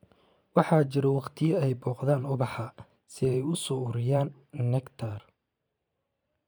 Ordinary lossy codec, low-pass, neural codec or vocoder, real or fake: none; none; vocoder, 44.1 kHz, 128 mel bands every 512 samples, BigVGAN v2; fake